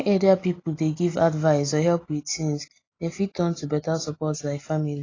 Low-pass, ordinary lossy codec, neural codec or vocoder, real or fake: 7.2 kHz; AAC, 32 kbps; none; real